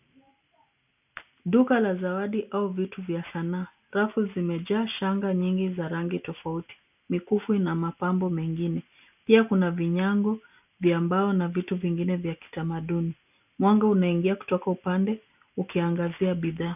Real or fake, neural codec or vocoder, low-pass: real; none; 3.6 kHz